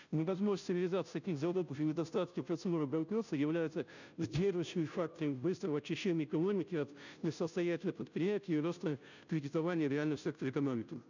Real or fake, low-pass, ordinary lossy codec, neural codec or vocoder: fake; 7.2 kHz; none; codec, 16 kHz, 0.5 kbps, FunCodec, trained on Chinese and English, 25 frames a second